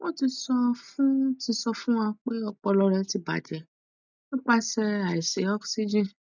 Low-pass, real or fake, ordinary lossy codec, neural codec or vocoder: 7.2 kHz; real; none; none